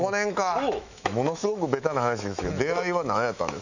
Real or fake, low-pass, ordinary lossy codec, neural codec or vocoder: fake; 7.2 kHz; none; autoencoder, 48 kHz, 128 numbers a frame, DAC-VAE, trained on Japanese speech